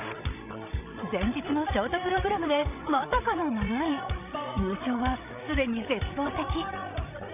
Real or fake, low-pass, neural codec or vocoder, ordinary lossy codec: fake; 3.6 kHz; codec, 16 kHz, 16 kbps, FreqCodec, larger model; none